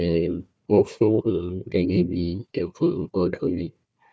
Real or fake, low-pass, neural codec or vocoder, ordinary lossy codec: fake; none; codec, 16 kHz, 1 kbps, FunCodec, trained on Chinese and English, 50 frames a second; none